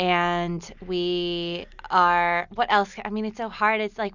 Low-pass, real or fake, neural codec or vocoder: 7.2 kHz; real; none